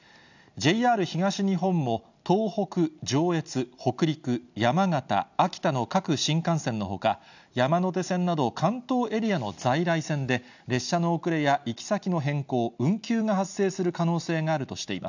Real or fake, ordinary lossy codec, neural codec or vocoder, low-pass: real; none; none; 7.2 kHz